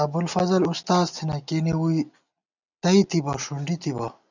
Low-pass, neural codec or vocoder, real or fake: 7.2 kHz; none; real